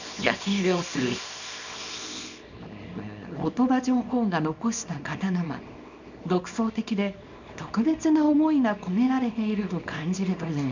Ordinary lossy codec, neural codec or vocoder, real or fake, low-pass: none; codec, 24 kHz, 0.9 kbps, WavTokenizer, small release; fake; 7.2 kHz